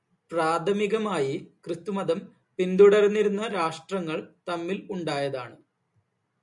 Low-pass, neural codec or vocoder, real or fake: 10.8 kHz; none; real